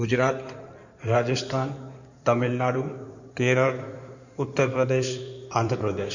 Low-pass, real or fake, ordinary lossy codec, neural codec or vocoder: 7.2 kHz; fake; none; codec, 16 kHz in and 24 kHz out, 2.2 kbps, FireRedTTS-2 codec